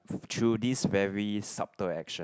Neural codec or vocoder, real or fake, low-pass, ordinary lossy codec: none; real; none; none